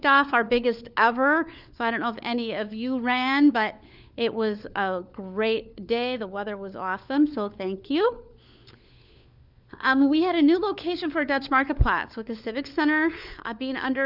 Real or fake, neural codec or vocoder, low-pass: fake; codec, 16 kHz, 4 kbps, FunCodec, trained on LibriTTS, 50 frames a second; 5.4 kHz